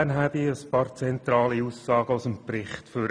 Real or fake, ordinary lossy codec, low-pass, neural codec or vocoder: real; none; 9.9 kHz; none